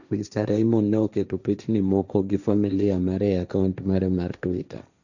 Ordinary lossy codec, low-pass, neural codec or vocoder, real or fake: none; 7.2 kHz; codec, 16 kHz, 1.1 kbps, Voila-Tokenizer; fake